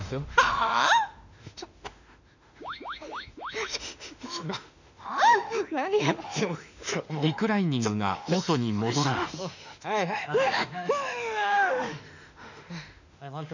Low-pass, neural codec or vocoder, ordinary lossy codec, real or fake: 7.2 kHz; autoencoder, 48 kHz, 32 numbers a frame, DAC-VAE, trained on Japanese speech; none; fake